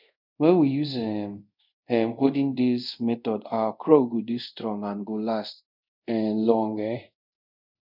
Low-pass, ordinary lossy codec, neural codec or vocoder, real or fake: 5.4 kHz; none; codec, 24 kHz, 0.5 kbps, DualCodec; fake